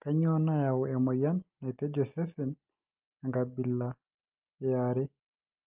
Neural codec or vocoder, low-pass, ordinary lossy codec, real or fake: none; 3.6 kHz; Opus, 32 kbps; real